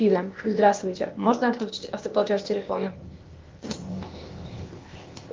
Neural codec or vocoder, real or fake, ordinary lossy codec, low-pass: codec, 16 kHz, 0.8 kbps, ZipCodec; fake; Opus, 32 kbps; 7.2 kHz